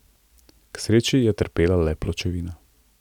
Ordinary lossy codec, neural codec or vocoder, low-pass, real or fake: none; none; 19.8 kHz; real